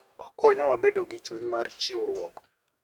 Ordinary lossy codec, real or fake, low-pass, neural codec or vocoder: none; fake; 19.8 kHz; codec, 44.1 kHz, 2.6 kbps, DAC